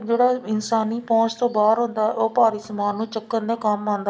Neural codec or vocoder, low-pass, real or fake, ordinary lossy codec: none; none; real; none